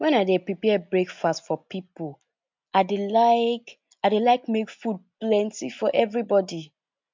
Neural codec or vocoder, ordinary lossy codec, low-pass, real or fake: none; MP3, 64 kbps; 7.2 kHz; real